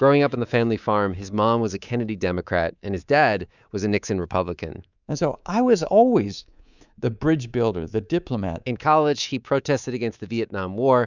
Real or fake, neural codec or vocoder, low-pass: fake; codec, 24 kHz, 3.1 kbps, DualCodec; 7.2 kHz